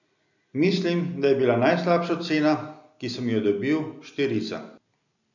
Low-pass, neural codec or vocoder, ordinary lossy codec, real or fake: 7.2 kHz; none; none; real